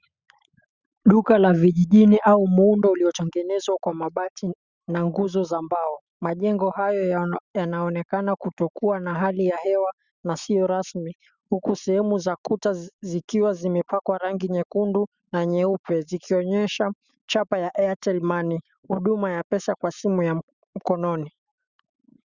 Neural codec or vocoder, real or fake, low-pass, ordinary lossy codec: autoencoder, 48 kHz, 128 numbers a frame, DAC-VAE, trained on Japanese speech; fake; 7.2 kHz; Opus, 64 kbps